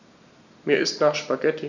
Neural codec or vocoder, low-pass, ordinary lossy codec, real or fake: none; 7.2 kHz; none; real